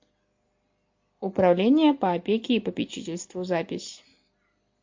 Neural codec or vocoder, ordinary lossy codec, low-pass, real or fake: none; MP3, 48 kbps; 7.2 kHz; real